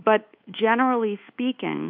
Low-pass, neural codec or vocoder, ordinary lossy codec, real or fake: 5.4 kHz; codec, 24 kHz, 1.2 kbps, DualCodec; MP3, 48 kbps; fake